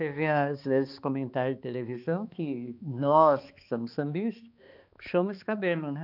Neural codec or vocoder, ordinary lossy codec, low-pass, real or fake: codec, 16 kHz, 2 kbps, X-Codec, HuBERT features, trained on balanced general audio; none; 5.4 kHz; fake